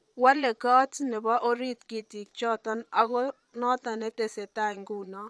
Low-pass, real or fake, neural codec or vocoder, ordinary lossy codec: none; fake; vocoder, 22.05 kHz, 80 mel bands, Vocos; none